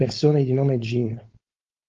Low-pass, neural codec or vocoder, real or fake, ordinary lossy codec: 7.2 kHz; codec, 16 kHz, 4.8 kbps, FACodec; fake; Opus, 32 kbps